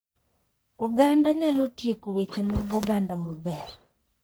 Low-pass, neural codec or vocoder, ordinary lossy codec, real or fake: none; codec, 44.1 kHz, 1.7 kbps, Pupu-Codec; none; fake